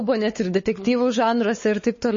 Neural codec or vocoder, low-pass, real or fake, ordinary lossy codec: codec, 16 kHz, 4 kbps, X-Codec, WavLM features, trained on Multilingual LibriSpeech; 7.2 kHz; fake; MP3, 32 kbps